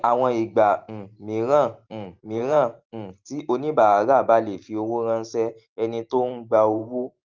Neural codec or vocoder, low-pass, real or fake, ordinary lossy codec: vocoder, 44.1 kHz, 128 mel bands every 512 samples, BigVGAN v2; 7.2 kHz; fake; Opus, 24 kbps